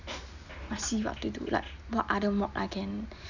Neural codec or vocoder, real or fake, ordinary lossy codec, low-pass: none; real; none; 7.2 kHz